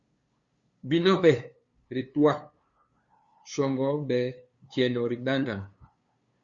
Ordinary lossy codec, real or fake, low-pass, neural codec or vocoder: Opus, 64 kbps; fake; 7.2 kHz; codec, 16 kHz, 2 kbps, FunCodec, trained on LibriTTS, 25 frames a second